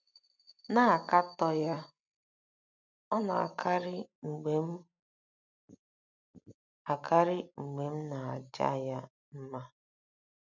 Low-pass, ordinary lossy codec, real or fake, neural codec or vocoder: 7.2 kHz; none; real; none